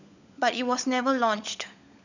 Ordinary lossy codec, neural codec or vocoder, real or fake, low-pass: none; codec, 16 kHz, 16 kbps, FunCodec, trained on LibriTTS, 50 frames a second; fake; 7.2 kHz